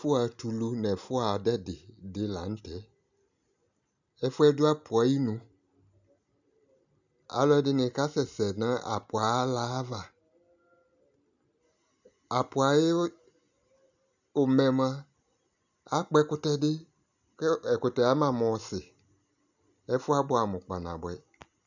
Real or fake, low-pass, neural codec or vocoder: real; 7.2 kHz; none